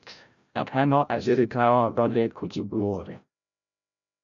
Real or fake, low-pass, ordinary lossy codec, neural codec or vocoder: fake; 7.2 kHz; MP3, 64 kbps; codec, 16 kHz, 0.5 kbps, FreqCodec, larger model